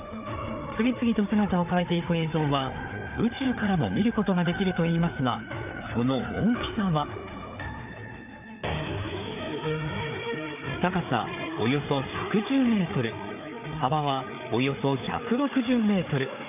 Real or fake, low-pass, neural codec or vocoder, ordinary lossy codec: fake; 3.6 kHz; codec, 16 kHz, 4 kbps, FreqCodec, larger model; none